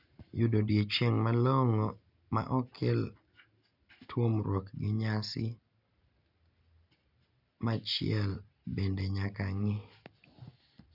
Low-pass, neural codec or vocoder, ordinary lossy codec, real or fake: 5.4 kHz; none; none; real